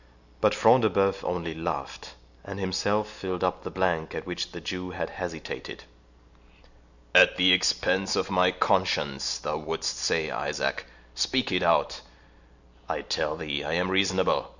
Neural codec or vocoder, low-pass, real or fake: none; 7.2 kHz; real